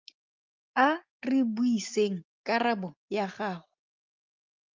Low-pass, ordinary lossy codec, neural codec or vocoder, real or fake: 7.2 kHz; Opus, 24 kbps; none; real